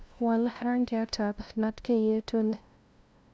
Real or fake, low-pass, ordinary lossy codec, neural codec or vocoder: fake; none; none; codec, 16 kHz, 0.5 kbps, FunCodec, trained on LibriTTS, 25 frames a second